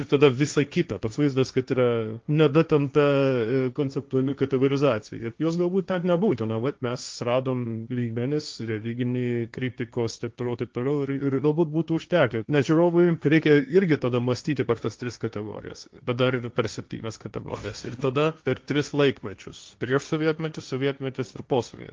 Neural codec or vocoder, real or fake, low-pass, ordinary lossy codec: codec, 16 kHz, 1.1 kbps, Voila-Tokenizer; fake; 7.2 kHz; Opus, 24 kbps